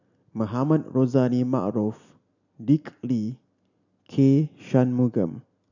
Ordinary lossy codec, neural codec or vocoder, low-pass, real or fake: none; none; 7.2 kHz; real